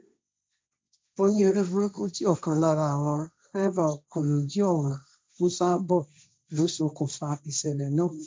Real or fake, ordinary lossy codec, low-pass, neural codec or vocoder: fake; none; none; codec, 16 kHz, 1.1 kbps, Voila-Tokenizer